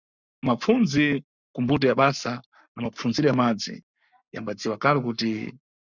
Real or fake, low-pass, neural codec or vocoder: fake; 7.2 kHz; codec, 44.1 kHz, 7.8 kbps, Pupu-Codec